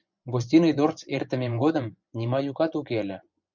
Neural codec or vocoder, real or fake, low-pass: vocoder, 24 kHz, 100 mel bands, Vocos; fake; 7.2 kHz